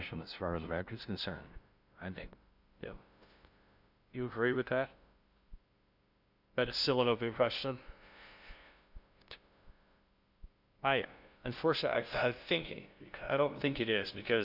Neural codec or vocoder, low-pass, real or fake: codec, 16 kHz, 0.5 kbps, FunCodec, trained on LibriTTS, 25 frames a second; 5.4 kHz; fake